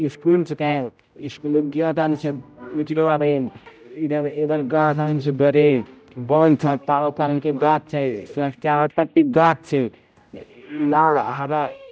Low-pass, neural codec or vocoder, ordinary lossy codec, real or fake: none; codec, 16 kHz, 0.5 kbps, X-Codec, HuBERT features, trained on general audio; none; fake